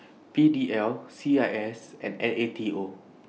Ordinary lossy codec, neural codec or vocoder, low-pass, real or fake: none; none; none; real